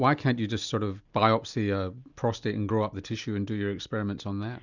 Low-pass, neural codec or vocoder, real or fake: 7.2 kHz; none; real